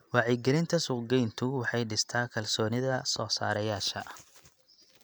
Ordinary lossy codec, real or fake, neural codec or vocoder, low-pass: none; real; none; none